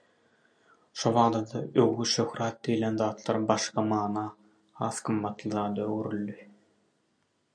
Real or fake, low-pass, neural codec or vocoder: real; 9.9 kHz; none